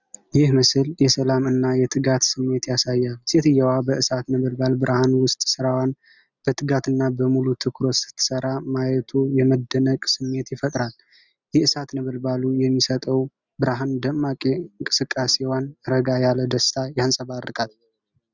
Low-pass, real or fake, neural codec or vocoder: 7.2 kHz; real; none